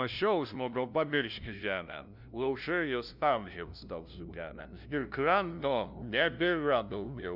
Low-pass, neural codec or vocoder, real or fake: 5.4 kHz; codec, 16 kHz, 0.5 kbps, FunCodec, trained on LibriTTS, 25 frames a second; fake